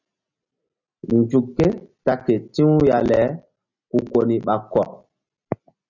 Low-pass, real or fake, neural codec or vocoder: 7.2 kHz; real; none